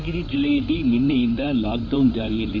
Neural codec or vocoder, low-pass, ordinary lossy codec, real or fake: codec, 16 kHz in and 24 kHz out, 2.2 kbps, FireRedTTS-2 codec; 7.2 kHz; none; fake